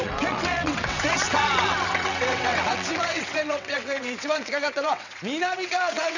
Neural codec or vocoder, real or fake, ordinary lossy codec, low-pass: vocoder, 22.05 kHz, 80 mel bands, WaveNeXt; fake; none; 7.2 kHz